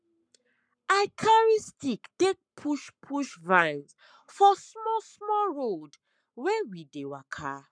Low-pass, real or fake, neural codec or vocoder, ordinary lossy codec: 9.9 kHz; fake; codec, 44.1 kHz, 7.8 kbps, Pupu-Codec; none